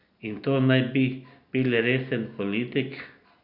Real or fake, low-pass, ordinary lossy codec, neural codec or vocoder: real; 5.4 kHz; Opus, 64 kbps; none